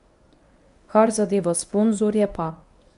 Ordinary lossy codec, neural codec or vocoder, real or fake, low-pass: none; codec, 24 kHz, 0.9 kbps, WavTokenizer, medium speech release version 1; fake; 10.8 kHz